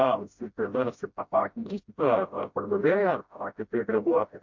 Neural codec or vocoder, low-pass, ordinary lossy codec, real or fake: codec, 16 kHz, 0.5 kbps, FreqCodec, smaller model; 7.2 kHz; MP3, 48 kbps; fake